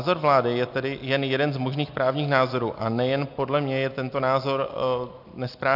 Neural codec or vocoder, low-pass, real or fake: none; 5.4 kHz; real